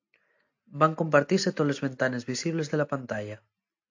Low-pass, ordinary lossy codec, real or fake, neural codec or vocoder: 7.2 kHz; AAC, 48 kbps; real; none